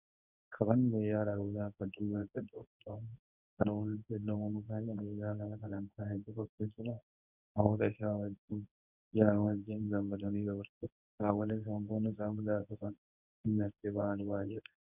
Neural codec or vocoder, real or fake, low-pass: codec, 24 kHz, 0.9 kbps, WavTokenizer, medium speech release version 1; fake; 3.6 kHz